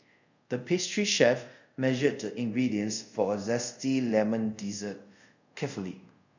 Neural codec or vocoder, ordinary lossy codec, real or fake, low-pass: codec, 24 kHz, 0.5 kbps, DualCodec; none; fake; 7.2 kHz